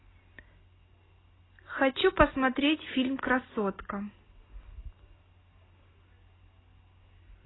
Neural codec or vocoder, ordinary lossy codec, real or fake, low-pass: none; AAC, 16 kbps; real; 7.2 kHz